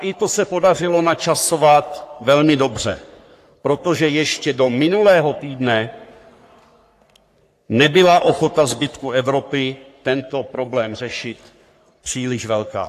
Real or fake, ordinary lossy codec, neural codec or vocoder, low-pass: fake; AAC, 64 kbps; codec, 44.1 kHz, 3.4 kbps, Pupu-Codec; 14.4 kHz